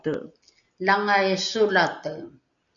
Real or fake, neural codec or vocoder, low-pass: real; none; 7.2 kHz